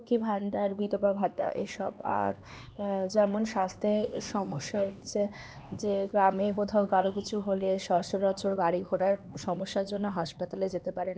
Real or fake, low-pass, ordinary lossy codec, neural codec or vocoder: fake; none; none; codec, 16 kHz, 2 kbps, X-Codec, HuBERT features, trained on LibriSpeech